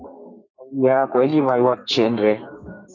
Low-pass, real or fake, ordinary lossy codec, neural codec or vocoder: 7.2 kHz; fake; AAC, 48 kbps; codec, 32 kHz, 1.9 kbps, SNAC